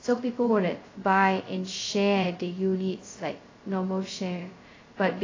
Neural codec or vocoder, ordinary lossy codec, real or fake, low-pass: codec, 16 kHz, 0.2 kbps, FocalCodec; AAC, 32 kbps; fake; 7.2 kHz